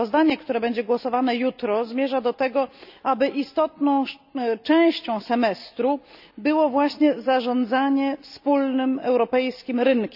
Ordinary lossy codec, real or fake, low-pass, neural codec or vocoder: none; real; 5.4 kHz; none